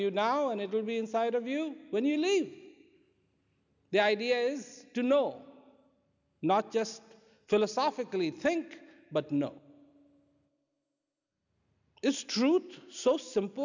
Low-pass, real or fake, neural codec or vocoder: 7.2 kHz; real; none